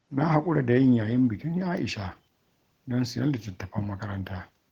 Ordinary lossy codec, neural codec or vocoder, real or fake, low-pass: Opus, 16 kbps; none; real; 19.8 kHz